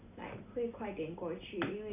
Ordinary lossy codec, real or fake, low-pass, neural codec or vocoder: none; real; 3.6 kHz; none